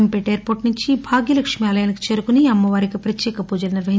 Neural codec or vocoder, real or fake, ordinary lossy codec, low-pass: none; real; none; none